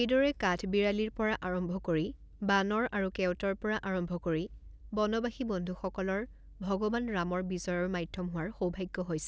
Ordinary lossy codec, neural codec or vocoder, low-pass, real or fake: none; none; none; real